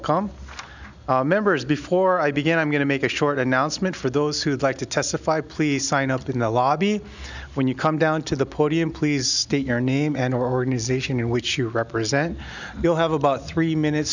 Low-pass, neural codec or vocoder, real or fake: 7.2 kHz; none; real